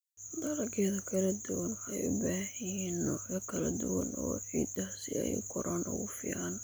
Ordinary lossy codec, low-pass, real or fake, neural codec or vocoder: none; none; real; none